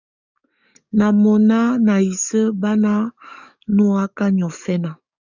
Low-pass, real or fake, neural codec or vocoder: 7.2 kHz; fake; codec, 44.1 kHz, 7.8 kbps, DAC